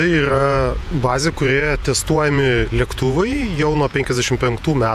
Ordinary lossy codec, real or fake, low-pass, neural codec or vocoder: AAC, 96 kbps; fake; 14.4 kHz; vocoder, 48 kHz, 128 mel bands, Vocos